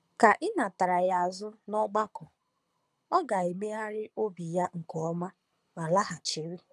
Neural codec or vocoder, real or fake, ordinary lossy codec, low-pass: codec, 24 kHz, 6 kbps, HILCodec; fake; none; none